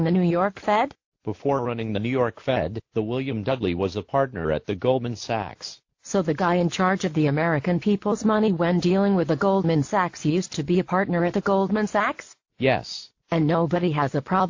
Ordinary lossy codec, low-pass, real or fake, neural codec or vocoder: AAC, 48 kbps; 7.2 kHz; real; none